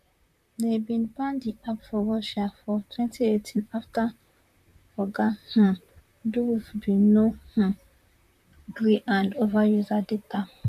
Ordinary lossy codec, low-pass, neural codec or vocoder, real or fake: none; 14.4 kHz; vocoder, 44.1 kHz, 128 mel bands, Pupu-Vocoder; fake